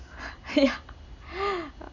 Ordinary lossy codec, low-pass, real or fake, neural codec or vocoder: none; 7.2 kHz; real; none